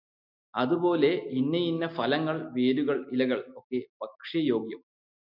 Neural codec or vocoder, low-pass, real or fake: none; 5.4 kHz; real